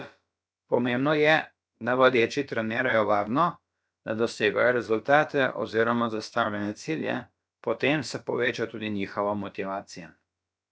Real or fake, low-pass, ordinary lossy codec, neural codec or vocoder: fake; none; none; codec, 16 kHz, about 1 kbps, DyCAST, with the encoder's durations